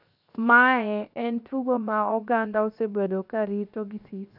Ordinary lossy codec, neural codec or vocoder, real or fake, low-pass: none; codec, 16 kHz, 0.7 kbps, FocalCodec; fake; 5.4 kHz